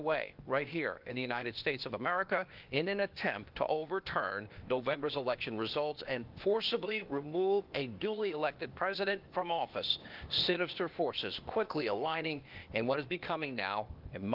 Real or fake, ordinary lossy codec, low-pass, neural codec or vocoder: fake; Opus, 24 kbps; 5.4 kHz; codec, 16 kHz, 0.8 kbps, ZipCodec